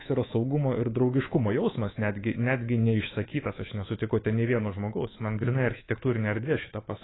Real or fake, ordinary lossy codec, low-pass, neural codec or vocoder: fake; AAC, 16 kbps; 7.2 kHz; codec, 24 kHz, 3.1 kbps, DualCodec